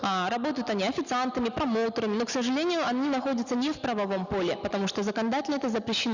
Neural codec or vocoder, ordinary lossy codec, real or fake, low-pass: none; none; real; 7.2 kHz